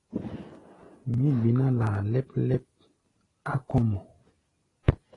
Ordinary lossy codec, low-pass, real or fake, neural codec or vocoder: AAC, 32 kbps; 10.8 kHz; real; none